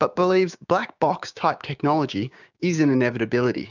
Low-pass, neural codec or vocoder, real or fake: 7.2 kHz; codec, 16 kHz, 6 kbps, DAC; fake